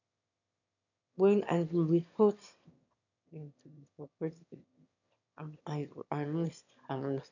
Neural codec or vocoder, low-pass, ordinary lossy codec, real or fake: autoencoder, 22.05 kHz, a latent of 192 numbers a frame, VITS, trained on one speaker; 7.2 kHz; none; fake